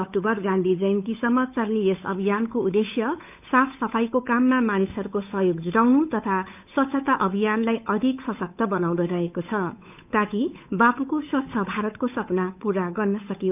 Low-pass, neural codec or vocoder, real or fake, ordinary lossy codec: 3.6 kHz; codec, 16 kHz, 8 kbps, FunCodec, trained on Chinese and English, 25 frames a second; fake; none